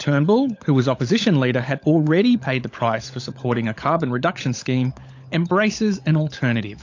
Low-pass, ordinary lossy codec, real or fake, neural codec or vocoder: 7.2 kHz; AAC, 48 kbps; fake; codec, 16 kHz, 16 kbps, FunCodec, trained on LibriTTS, 50 frames a second